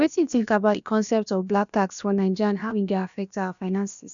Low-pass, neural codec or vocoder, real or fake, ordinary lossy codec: 7.2 kHz; codec, 16 kHz, about 1 kbps, DyCAST, with the encoder's durations; fake; none